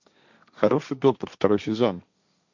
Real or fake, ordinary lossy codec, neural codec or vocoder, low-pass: fake; MP3, 64 kbps; codec, 16 kHz, 1.1 kbps, Voila-Tokenizer; 7.2 kHz